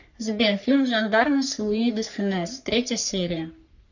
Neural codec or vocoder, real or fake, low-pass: codec, 44.1 kHz, 3.4 kbps, Pupu-Codec; fake; 7.2 kHz